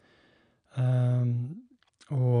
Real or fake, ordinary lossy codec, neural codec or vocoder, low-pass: real; none; none; 9.9 kHz